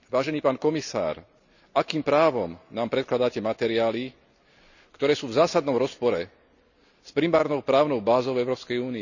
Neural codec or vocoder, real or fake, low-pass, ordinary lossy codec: none; real; 7.2 kHz; none